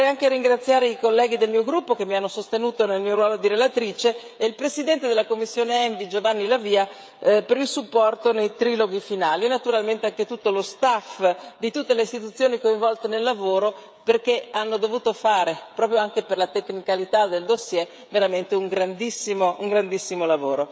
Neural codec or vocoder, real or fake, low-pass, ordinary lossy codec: codec, 16 kHz, 16 kbps, FreqCodec, smaller model; fake; none; none